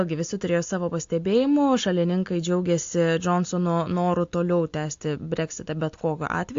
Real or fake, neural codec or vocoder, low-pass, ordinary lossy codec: real; none; 7.2 kHz; AAC, 48 kbps